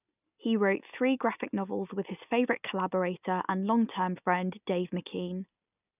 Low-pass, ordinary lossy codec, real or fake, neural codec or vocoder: 3.6 kHz; none; real; none